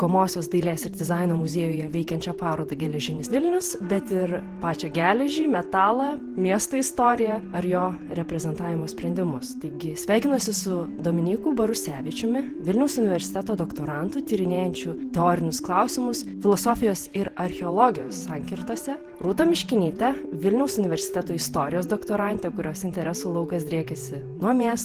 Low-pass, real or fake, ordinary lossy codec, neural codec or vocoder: 14.4 kHz; fake; Opus, 16 kbps; vocoder, 48 kHz, 128 mel bands, Vocos